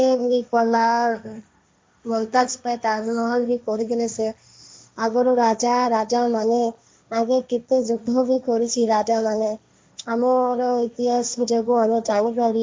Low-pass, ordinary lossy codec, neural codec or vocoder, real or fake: none; none; codec, 16 kHz, 1.1 kbps, Voila-Tokenizer; fake